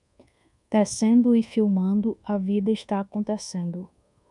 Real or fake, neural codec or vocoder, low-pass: fake; codec, 24 kHz, 1.2 kbps, DualCodec; 10.8 kHz